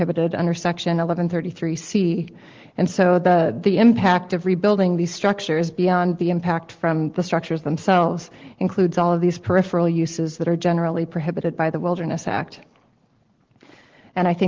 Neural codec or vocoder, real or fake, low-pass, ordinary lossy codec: none; real; 7.2 kHz; Opus, 16 kbps